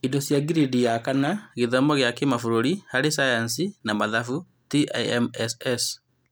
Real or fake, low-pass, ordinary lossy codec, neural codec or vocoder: real; none; none; none